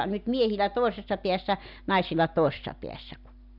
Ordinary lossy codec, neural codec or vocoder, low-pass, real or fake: none; none; 5.4 kHz; real